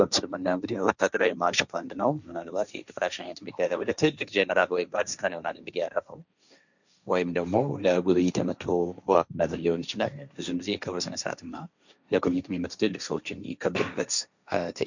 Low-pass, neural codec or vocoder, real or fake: 7.2 kHz; codec, 16 kHz, 1.1 kbps, Voila-Tokenizer; fake